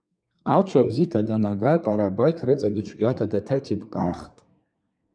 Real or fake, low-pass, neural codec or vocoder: fake; 9.9 kHz; codec, 24 kHz, 1 kbps, SNAC